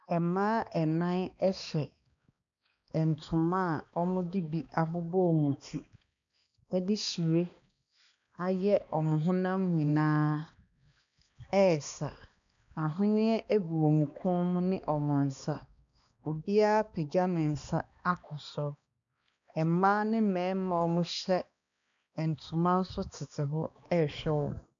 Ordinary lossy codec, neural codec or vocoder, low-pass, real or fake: MP3, 96 kbps; codec, 16 kHz, 2 kbps, X-Codec, HuBERT features, trained on balanced general audio; 7.2 kHz; fake